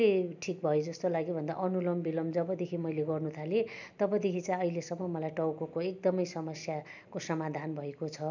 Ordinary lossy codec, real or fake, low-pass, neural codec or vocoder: none; real; 7.2 kHz; none